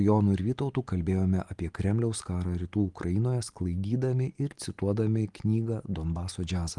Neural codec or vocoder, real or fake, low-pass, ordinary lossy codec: none; real; 10.8 kHz; Opus, 24 kbps